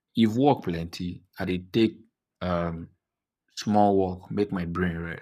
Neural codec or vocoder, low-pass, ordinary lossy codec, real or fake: codec, 44.1 kHz, 7.8 kbps, Pupu-Codec; 14.4 kHz; none; fake